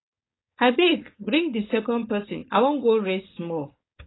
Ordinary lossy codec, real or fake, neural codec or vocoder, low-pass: AAC, 16 kbps; fake; codec, 16 kHz, 4.8 kbps, FACodec; 7.2 kHz